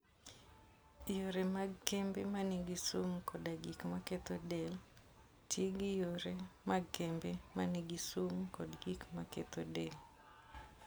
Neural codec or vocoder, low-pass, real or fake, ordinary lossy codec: none; none; real; none